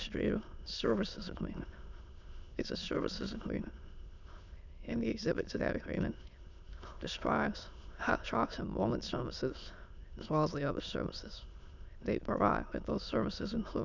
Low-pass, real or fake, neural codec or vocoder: 7.2 kHz; fake; autoencoder, 22.05 kHz, a latent of 192 numbers a frame, VITS, trained on many speakers